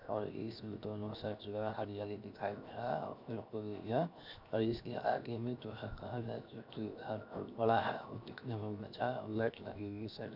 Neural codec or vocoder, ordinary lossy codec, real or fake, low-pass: codec, 16 kHz, 0.7 kbps, FocalCodec; none; fake; 5.4 kHz